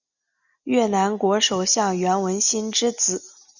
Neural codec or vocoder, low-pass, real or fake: none; 7.2 kHz; real